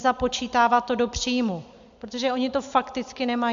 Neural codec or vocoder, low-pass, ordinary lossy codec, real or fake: none; 7.2 kHz; MP3, 64 kbps; real